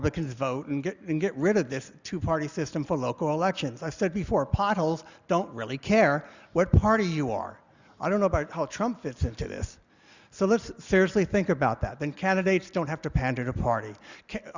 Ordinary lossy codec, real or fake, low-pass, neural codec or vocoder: Opus, 64 kbps; real; 7.2 kHz; none